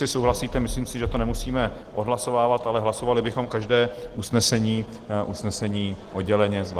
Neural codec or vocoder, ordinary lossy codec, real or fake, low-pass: none; Opus, 16 kbps; real; 14.4 kHz